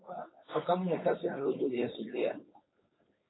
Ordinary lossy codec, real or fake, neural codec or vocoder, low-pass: AAC, 16 kbps; fake; codec, 16 kHz, 4.8 kbps, FACodec; 7.2 kHz